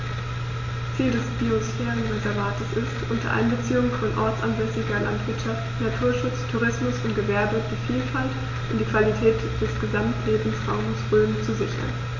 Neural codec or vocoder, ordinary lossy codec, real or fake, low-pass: none; MP3, 48 kbps; real; 7.2 kHz